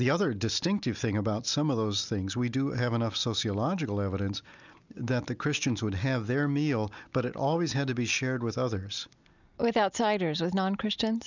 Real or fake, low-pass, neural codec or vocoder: real; 7.2 kHz; none